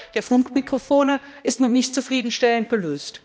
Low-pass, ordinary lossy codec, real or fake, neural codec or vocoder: none; none; fake; codec, 16 kHz, 1 kbps, X-Codec, HuBERT features, trained on balanced general audio